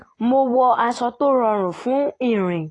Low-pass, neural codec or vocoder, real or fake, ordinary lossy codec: 10.8 kHz; none; real; AAC, 32 kbps